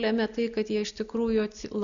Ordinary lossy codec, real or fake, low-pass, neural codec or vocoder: MP3, 96 kbps; real; 7.2 kHz; none